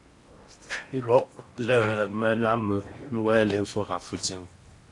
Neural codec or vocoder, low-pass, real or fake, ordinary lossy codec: codec, 16 kHz in and 24 kHz out, 0.8 kbps, FocalCodec, streaming, 65536 codes; 10.8 kHz; fake; AAC, 48 kbps